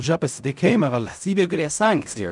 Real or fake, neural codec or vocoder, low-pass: fake; codec, 16 kHz in and 24 kHz out, 0.4 kbps, LongCat-Audio-Codec, fine tuned four codebook decoder; 10.8 kHz